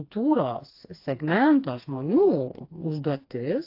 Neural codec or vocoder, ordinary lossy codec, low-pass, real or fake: codec, 16 kHz, 2 kbps, FreqCodec, smaller model; AAC, 32 kbps; 5.4 kHz; fake